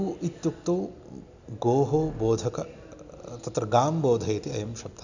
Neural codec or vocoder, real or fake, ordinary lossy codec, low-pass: none; real; none; 7.2 kHz